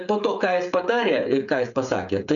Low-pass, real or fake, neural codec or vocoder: 7.2 kHz; fake; codec, 16 kHz, 8 kbps, FreqCodec, smaller model